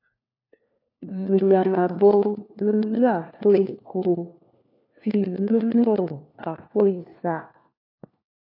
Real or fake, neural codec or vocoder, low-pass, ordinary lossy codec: fake; codec, 16 kHz, 1 kbps, FunCodec, trained on LibriTTS, 50 frames a second; 5.4 kHz; AAC, 32 kbps